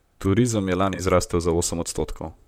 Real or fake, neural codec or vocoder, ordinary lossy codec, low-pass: fake; vocoder, 44.1 kHz, 128 mel bands, Pupu-Vocoder; MP3, 96 kbps; 19.8 kHz